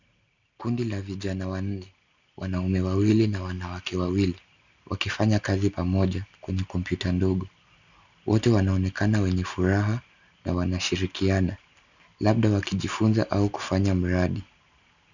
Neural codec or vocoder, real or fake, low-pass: none; real; 7.2 kHz